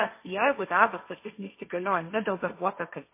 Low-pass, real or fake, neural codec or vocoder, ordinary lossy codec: 3.6 kHz; fake; codec, 16 kHz, 1.1 kbps, Voila-Tokenizer; MP3, 24 kbps